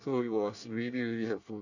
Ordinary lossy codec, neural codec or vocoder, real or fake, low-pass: none; codec, 24 kHz, 1 kbps, SNAC; fake; 7.2 kHz